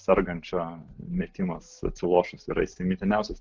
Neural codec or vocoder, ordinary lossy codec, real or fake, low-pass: none; Opus, 32 kbps; real; 7.2 kHz